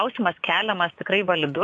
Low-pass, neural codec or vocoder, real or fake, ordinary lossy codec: 10.8 kHz; vocoder, 24 kHz, 100 mel bands, Vocos; fake; AAC, 64 kbps